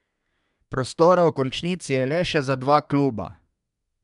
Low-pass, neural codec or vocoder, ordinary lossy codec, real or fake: 10.8 kHz; codec, 24 kHz, 1 kbps, SNAC; none; fake